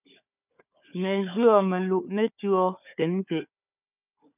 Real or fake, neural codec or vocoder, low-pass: fake; codec, 16 kHz, 4 kbps, FunCodec, trained on Chinese and English, 50 frames a second; 3.6 kHz